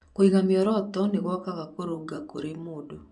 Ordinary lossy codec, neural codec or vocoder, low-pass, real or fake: none; vocoder, 24 kHz, 100 mel bands, Vocos; 10.8 kHz; fake